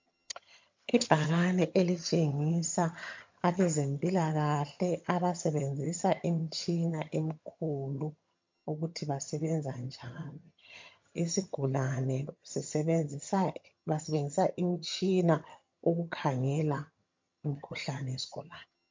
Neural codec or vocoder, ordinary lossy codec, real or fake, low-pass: vocoder, 22.05 kHz, 80 mel bands, HiFi-GAN; MP3, 48 kbps; fake; 7.2 kHz